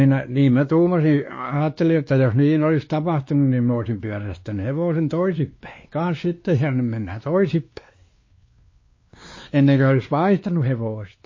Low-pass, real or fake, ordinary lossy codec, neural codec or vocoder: 7.2 kHz; fake; MP3, 32 kbps; codec, 16 kHz, 2 kbps, X-Codec, WavLM features, trained on Multilingual LibriSpeech